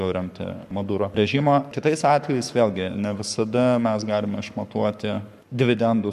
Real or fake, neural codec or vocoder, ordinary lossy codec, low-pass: fake; codec, 44.1 kHz, 7.8 kbps, Pupu-Codec; MP3, 96 kbps; 14.4 kHz